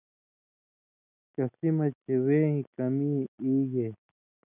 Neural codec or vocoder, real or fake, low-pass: none; real; 3.6 kHz